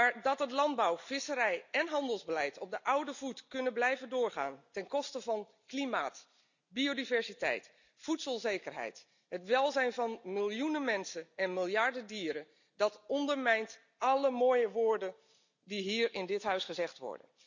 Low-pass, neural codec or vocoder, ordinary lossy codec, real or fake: 7.2 kHz; none; none; real